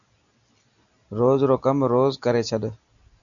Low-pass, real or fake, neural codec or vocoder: 7.2 kHz; real; none